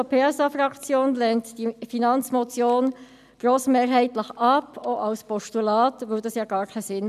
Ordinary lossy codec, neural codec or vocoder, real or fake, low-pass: none; none; real; 14.4 kHz